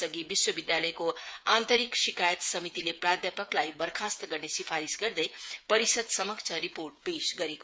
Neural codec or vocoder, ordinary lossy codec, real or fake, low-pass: codec, 16 kHz, 8 kbps, FreqCodec, smaller model; none; fake; none